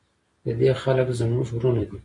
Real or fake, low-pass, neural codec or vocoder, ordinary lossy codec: real; 10.8 kHz; none; MP3, 48 kbps